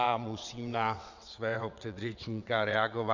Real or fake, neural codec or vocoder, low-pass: fake; vocoder, 22.05 kHz, 80 mel bands, WaveNeXt; 7.2 kHz